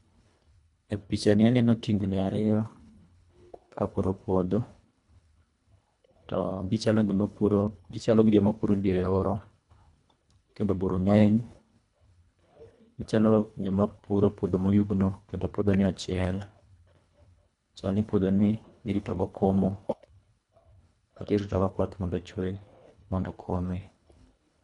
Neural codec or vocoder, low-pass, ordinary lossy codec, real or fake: codec, 24 kHz, 1.5 kbps, HILCodec; 10.8 kHz; none; fake